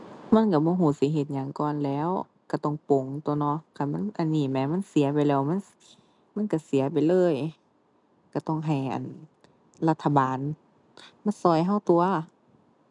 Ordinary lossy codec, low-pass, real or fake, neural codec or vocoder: none; 10.8 kHz; real; none